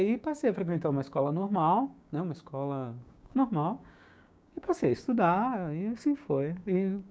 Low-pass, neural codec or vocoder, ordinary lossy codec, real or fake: none; codec, 16 kHz, 6 kbps, DAC; none; fake